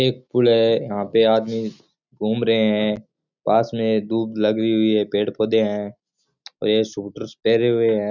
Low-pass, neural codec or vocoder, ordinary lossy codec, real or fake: 7.2 kHz; none; none; real